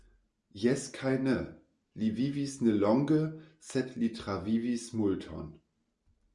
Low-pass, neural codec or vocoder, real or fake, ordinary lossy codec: 10.8 kHz; none; real; Opus, 64 kbps